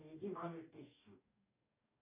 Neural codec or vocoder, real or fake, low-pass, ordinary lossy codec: codec, 44.1 kHz, 2.6 kbps, DAC; fake; 3.6 kHz; AAC, 32 kbps